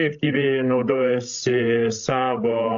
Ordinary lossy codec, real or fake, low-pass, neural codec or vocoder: AAC, 64 kbps; fake; 7.2 kHz; codec, 16 kHz, 16 kbps, FreqCodec, larger model